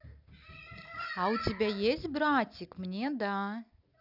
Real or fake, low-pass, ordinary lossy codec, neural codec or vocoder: real; 5.4 kHz; none; none